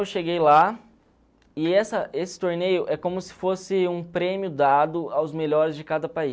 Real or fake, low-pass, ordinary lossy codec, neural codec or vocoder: real; none; none; none